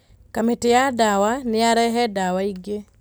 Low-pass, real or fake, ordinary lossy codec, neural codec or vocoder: none; real; none; none